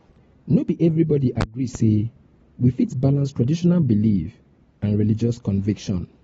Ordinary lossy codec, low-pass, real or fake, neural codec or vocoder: AAC, 24 kbps; 19.8 kHz; real; none